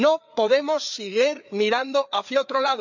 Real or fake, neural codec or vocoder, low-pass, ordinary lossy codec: fake; codec, 16 kHz, 4 kbps, FreqCodec, larger model; 7.2 kHz; none